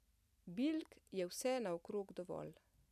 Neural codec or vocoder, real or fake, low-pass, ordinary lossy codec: none; real; 14.4 kHz; none